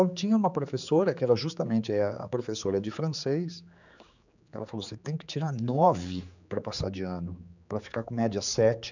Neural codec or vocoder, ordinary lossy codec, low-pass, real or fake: codec, 16 kHz, 4 kbps, X-Codec, HuBERT features, trained on general audio; none; 7.2 kHz; fake